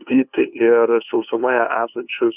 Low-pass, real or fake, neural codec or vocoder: 3.6 kHz; fake; codec, 16 kHz, 2 kbps, FunCodec, trained on LibriTTS, 25 frames a second